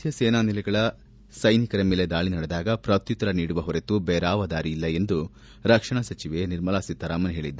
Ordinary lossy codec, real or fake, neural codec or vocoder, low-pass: none; real; none; none